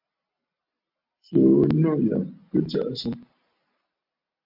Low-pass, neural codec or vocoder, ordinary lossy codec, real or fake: 5.4 kHz; none; MP3, 48 kbps; real